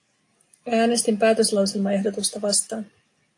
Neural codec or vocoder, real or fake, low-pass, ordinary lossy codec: none; real; 10.8 kHz; AAC, 48 kbps